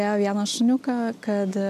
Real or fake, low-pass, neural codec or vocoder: real; 14.4 kHz; none